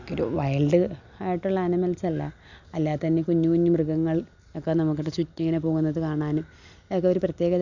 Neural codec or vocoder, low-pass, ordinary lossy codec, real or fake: none; 7.2 kHz; none; real